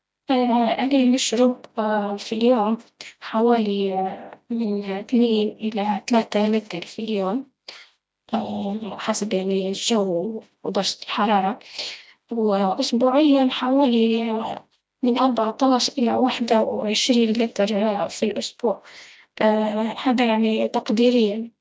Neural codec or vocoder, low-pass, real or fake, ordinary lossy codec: codec, 16 kHz, 1 kbps, FreqCodec, smaller model; none; fake; none